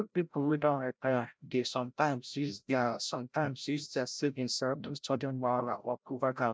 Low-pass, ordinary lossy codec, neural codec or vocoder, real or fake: none; none; codec, 16 kHz, 0.5 kbps, FreqCodec, larger model; fake